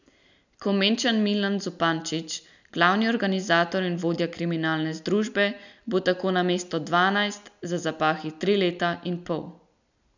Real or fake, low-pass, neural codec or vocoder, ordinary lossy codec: real; 7.2 kHz; none; none